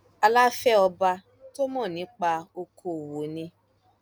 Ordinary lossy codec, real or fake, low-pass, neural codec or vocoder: none; real; none; none